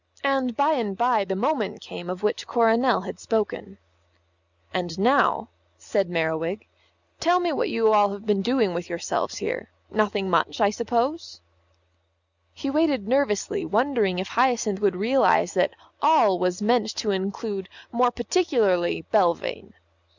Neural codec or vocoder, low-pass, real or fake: none; 7.2 kHz; real